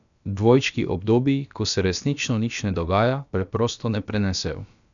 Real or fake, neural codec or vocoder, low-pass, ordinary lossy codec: fake; codec, 16 kHz, about 1 kbps, DyCAST, with the encoder's durations; 7.2 kHz; none